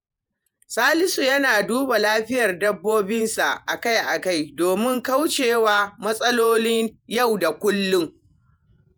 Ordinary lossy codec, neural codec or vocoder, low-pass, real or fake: none; vocoder, 48 kHz, 128 mel bands, Vocos; none; fake